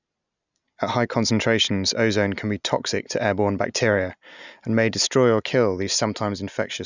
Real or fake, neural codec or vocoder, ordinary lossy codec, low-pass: real; none; none; 7.2 kHz